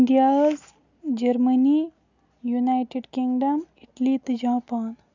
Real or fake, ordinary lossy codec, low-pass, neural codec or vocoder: real; none; 7.2 kHz; none